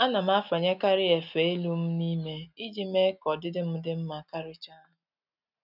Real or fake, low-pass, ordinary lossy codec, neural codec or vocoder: real; 5.4 kHz; none; none